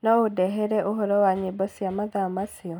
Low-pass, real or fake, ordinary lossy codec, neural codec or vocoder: none; real; none; none